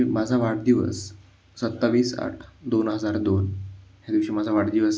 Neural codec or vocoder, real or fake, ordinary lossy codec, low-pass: none; real; none; none